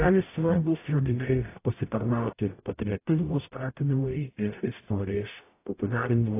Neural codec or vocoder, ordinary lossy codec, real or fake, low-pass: codec, 44.1 kHz, 0.9 kbps, DAC; AAC, 24 kbps; fake; 3.6 kHz